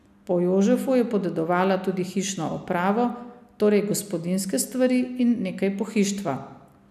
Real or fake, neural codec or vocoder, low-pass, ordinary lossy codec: real; none; 14.4 kHz; none